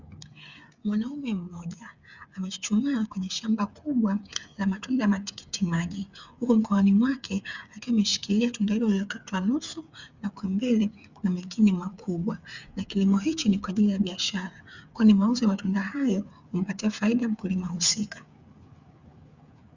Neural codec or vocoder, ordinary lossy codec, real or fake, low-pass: codec, 16 kHz, 8 kbps, FreqCodec, smaller model; Opus, 64 kbps; fake; 7.2 kHz